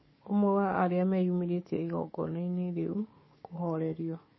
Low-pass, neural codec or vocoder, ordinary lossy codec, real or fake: 7.2 kHz; codec, 44.1 kHz, 7.8 kbps, DAC; MP3, 24 kbps; fake